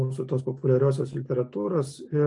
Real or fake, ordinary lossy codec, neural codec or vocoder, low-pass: real; AAC, 64 kbps; none; 10.8 kHz